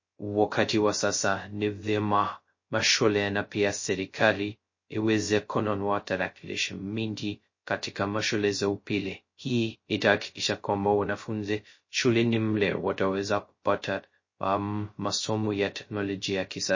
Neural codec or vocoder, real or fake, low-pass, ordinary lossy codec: codec, 16 kHz, 0.2 kbps, FocalCodec; fake; 7.2 kHz; MP3, 32 kbps